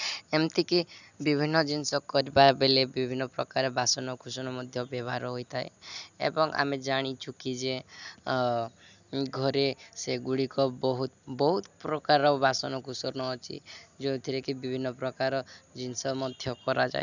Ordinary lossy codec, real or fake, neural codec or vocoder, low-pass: none; real; none; 7.2 kHz